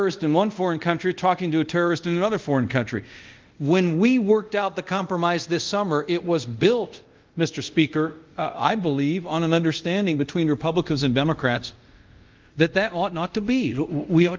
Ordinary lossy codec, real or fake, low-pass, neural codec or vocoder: Opus, 24 kbps; fake; 7.2 kHz; codec, 24 kHz, 0.5 kbps, DualCodec